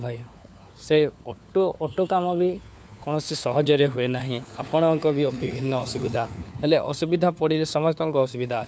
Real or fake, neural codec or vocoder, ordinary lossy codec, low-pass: fake; codec, 16 kHz, 4 kbps, FunCodec, trained on LibriTTS, 50 frames a second; none; none